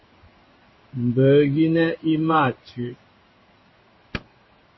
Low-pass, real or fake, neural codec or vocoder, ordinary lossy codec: 7.2 kHz; fake; codec, 16 kHz in and 24 kHz out, 1 kbps, XY-Tokenizer; MP3, 24 kbps